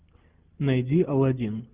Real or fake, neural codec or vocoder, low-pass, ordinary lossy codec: real; none; 3.6 kHz; Opus, 24 kbps